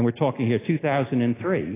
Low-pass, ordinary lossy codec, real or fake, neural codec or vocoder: 3.6 kHz; AAC, 16 kbps; real; none